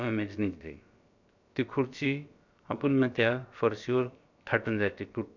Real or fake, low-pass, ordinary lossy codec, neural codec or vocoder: fake; 7.2 kHz; none; codec, 16 kHz, about 1 kbps, DyCAST, with the encoder's durations